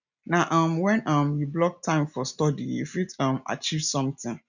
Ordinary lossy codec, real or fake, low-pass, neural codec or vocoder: none; real; 7.2 kHz; none